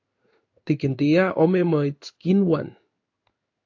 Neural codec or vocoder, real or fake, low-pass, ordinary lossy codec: codec, 16 kHz in and 24 kHz out, 1 kbps, XY-Tokenizer; fake; 7.2 kHz; AAC, 48 kbps